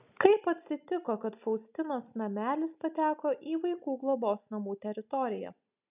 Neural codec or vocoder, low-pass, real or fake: none; 3.6 kHz; real